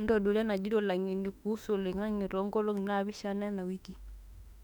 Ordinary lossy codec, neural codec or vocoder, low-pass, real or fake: none; autoencoder, 48 kHz, 32 numbers a frame, DAC-VAE, trained on Japanese speech; 19.8 kHz; fake